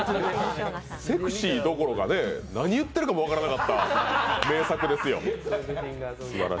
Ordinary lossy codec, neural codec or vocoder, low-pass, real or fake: none; none; none; real